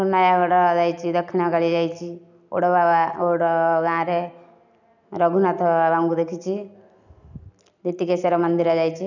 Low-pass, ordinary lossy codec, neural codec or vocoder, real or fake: 7.2 kHz; none; none; real